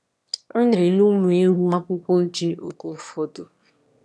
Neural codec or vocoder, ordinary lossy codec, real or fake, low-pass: autoencoder, 22.05 kHz, a latent of 192 numbers a frame, VITS, trained on one speaker; none; fake; none